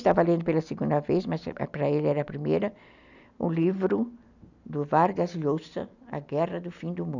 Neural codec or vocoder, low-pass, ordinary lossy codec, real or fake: none; 7.2 kHz; none; real